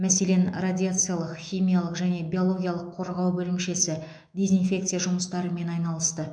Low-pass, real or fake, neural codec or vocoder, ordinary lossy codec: 9.9 kHz; fake; autoencoder, 48 kHz, 128 numbers a frame, DAC-VAE, trained on Japanese speech; none